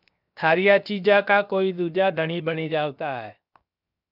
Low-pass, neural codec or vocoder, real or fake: 5.4 kHz; codec, 16 kHz, 0.7 kbps, FocalCodec; fake